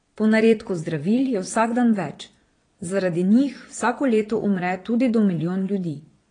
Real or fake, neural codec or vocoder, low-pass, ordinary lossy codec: fake; vocoder, 22.05 kHz, 80 mel bands, Vocos; 9.9 kHz; AAC, 32 kbps